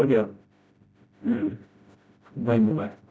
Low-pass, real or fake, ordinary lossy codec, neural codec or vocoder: none; fake; none; codec, 16 kHz, 0.5 kbps, FreqCodec, smaller model